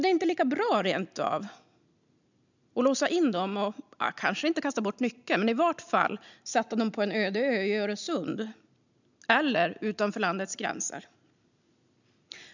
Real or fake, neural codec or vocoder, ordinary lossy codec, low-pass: real; none; none; 7.2 kHz